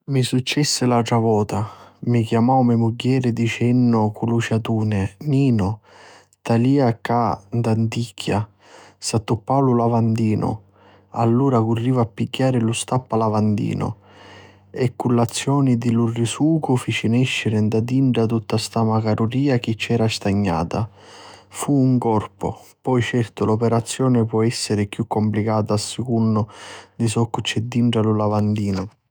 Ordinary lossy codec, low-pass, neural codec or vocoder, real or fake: none; none; autoencoder, 48 kHz, 128 numbers a frame, DAC-VAE, trained on Japanese speech; fake